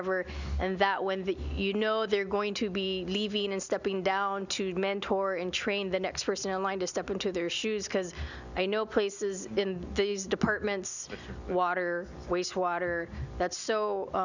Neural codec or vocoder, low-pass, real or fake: none; 7.2 kHz; real